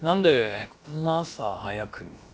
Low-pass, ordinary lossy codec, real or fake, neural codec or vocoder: none; none; fake; codec, 16 kHz, about 1 kbps, DyCAST, with the encoder's durations